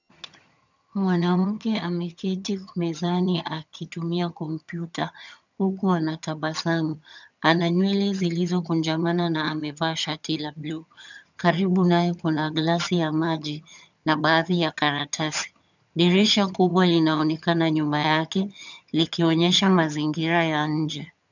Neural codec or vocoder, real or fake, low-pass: vocoder, 22.05 kHz, 80 mel bands, HiFi-GAN; fake; 7.2 kHz